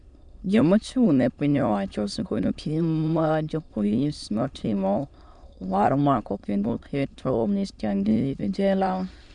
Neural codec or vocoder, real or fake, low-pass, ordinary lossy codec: autoencoder, 22.05 kHz, a latent of 192 numbers a frame, VITS, trained on many speakers; fake; 9.9 kHz; MP3, 96 kbps